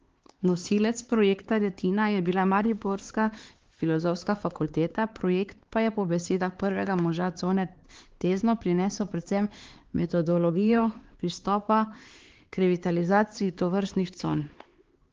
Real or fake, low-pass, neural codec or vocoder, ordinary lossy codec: fake; 7.2 kHz; codec, 16 kHz, 4 kbps, X-Codec, HuBERT features, trained on LibriSpeech; Opus, 16 kbps